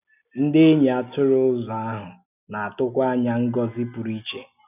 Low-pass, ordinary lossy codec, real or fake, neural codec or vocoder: 3.6 kHz; none; real; none